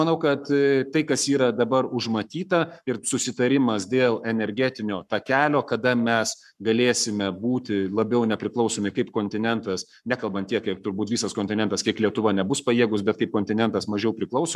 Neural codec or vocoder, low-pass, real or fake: codec, 44.1 kHz, 7.8 kbps, Pupu-Codec; 14.4 kHz; fake